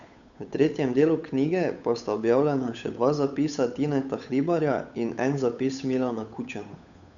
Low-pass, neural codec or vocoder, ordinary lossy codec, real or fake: 7.2 kHz; codec, 16 kHz, 8 kbps, FunCodec, trained on Chinese and English, 25 frames a second; none; fake